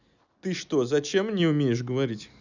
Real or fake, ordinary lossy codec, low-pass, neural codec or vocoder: real; none; 7.2 kHz; none